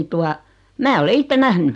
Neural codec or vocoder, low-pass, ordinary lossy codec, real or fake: none; 10.8 kHz; none; real